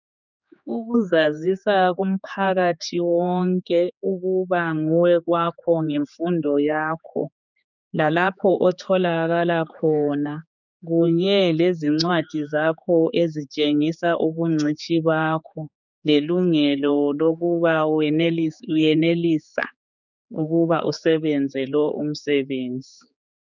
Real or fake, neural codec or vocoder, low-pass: fake; codec, 16 kHz, 4 kbps, X-Codec, HuBERT features, trained on general audio; 7.2 kHz